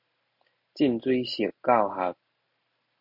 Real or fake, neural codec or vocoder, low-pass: real; none; 5.4 kHz